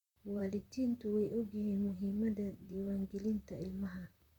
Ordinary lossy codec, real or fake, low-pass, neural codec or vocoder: none; fake; 19.8 kHz; vocoder, 44.1 kHz, 128 mel bands, Pupu-Vocoder